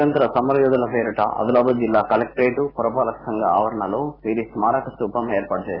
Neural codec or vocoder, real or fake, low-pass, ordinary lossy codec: none; real; 5.4 kHz; Opus, 64 kbps